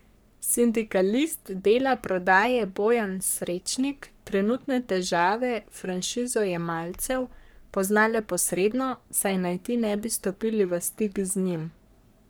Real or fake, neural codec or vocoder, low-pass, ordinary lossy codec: fake; codec, 44.1 kHz, 3.4 kbps, Pupu-Codec; none; none